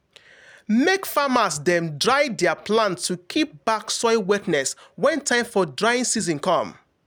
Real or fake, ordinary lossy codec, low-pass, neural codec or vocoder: real; none; none; none